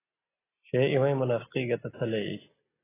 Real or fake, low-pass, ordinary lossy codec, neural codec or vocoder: real; 3.6 kHz; AAC, 16 kbps; none